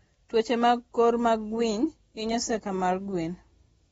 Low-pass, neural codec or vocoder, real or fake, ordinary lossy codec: 19.8 kHz; none; real; AAC, 24 kbps